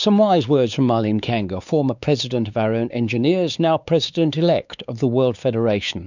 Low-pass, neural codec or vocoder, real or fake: 7.2 kHz; codec, 16 kHz, 4 kbps, X-Codec, WavLM features, trained on Multilingual LibriSpeech; fake